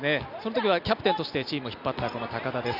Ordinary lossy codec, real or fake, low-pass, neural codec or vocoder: none; real; 5.4 kHz; none